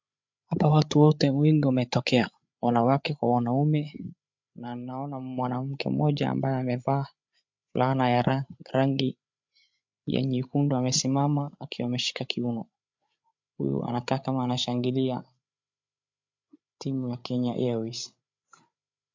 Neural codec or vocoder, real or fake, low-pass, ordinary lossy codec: codec, 16 kHz, 8 kbps, FreqCodec, larger model; fake; 7.2 kHz; MP3, 64 kbps